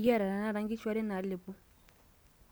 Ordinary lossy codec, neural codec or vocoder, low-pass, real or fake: none; none; none; real